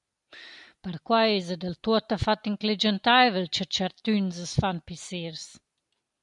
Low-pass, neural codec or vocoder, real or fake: 10.8 kHz; none; real